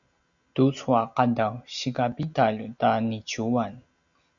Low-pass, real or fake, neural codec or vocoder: 7.2 kHz; real; none